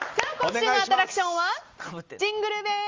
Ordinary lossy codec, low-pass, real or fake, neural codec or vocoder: Opus, 32 kbps; 7.2 kHz; real; none